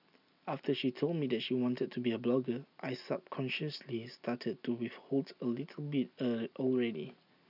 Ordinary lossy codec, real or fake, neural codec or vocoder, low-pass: none; real; none; 5.4 kHz